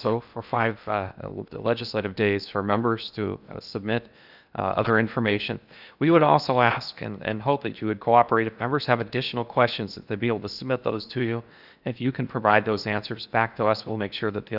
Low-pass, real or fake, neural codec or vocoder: 5.4 kHz; fake; codec, 16 kHz in and 24 kHz out, 0.8 kbps, FocalCodec, streaming, 65536 codes